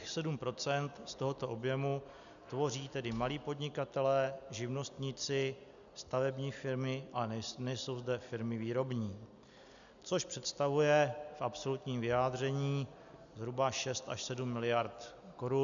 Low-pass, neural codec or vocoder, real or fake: 7.2 kHz; none; real